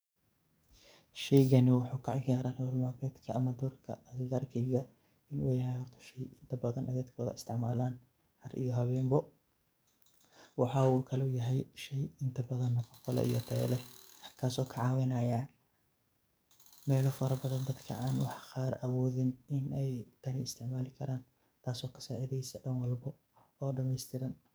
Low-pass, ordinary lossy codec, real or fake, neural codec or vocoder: none; none; fake; codec, 44.1 kHz, 7.8 kbps, DAC